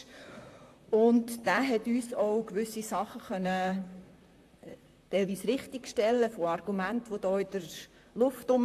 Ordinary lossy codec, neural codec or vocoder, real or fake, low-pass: AAC, 64 kbps; vocoder, 44.1 kHz, 128 mel bands, Pupu-Vocoder; fake; 14.4 kHz